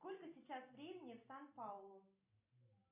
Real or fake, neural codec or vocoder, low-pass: real; none; 3.6 kHz